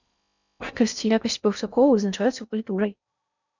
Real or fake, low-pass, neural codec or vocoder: fake; 7.2 kHz; codec, 16 kHz in and 24 kHz out, 0.6 kbps, FocalCodec, streaming, 4096 codes